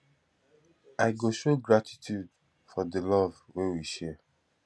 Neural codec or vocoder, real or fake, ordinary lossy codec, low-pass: none; real; none; none